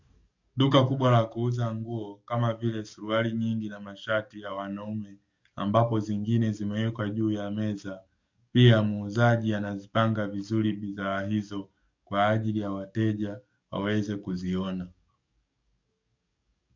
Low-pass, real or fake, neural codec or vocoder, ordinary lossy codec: 7.2 kHz; fake; codec, 44.1 kHz, 7.8 kbps, DAC; MP3, 64 kbps